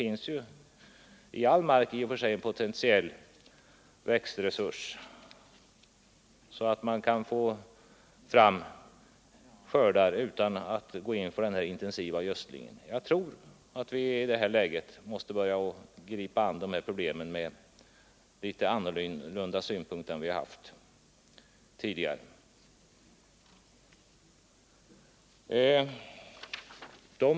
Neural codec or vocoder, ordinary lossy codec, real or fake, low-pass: none; none; real; none